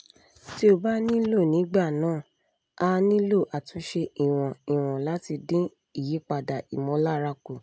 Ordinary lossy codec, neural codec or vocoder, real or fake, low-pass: none; none; real; none